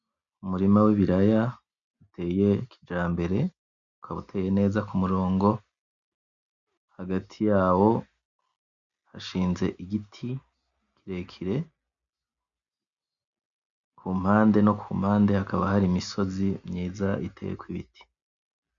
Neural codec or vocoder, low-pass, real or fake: none; 7.2 kHz; real